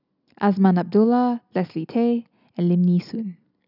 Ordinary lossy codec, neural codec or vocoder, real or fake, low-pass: none; none; real; 5.4 kHz